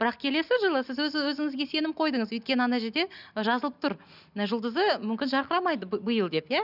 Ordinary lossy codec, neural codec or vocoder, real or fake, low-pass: none; none; real; 5.4 kHz